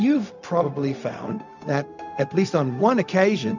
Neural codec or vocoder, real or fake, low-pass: codec, 16 kHz, 0.4 kbps, LongCat-Audio-Codec; fake; 7.2 kHz